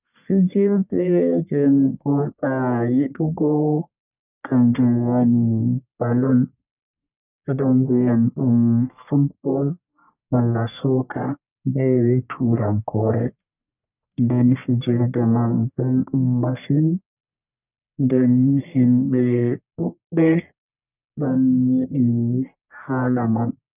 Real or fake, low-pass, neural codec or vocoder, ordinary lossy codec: fake; 3.6 kHz; codec, 44.1 kHz, 1.7 kbps, Pupu-Codec; none